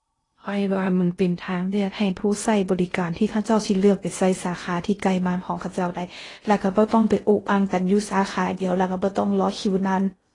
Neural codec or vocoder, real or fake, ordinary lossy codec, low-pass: codec, 16 kHz in and 24 kHz out, 0.6 kbps, FocalCodec, streaming, 2048 codes; fake; AAC, 32 kbps; 10.8 kHz